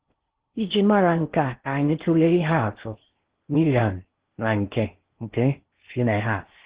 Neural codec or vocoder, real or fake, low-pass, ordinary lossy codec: codec, 16 kHz in and 24 kHz out, 0.6 kbps, FocalCodec, streaming, 4096 codes; fake; 3.6 kHz; Opus, 16 kbps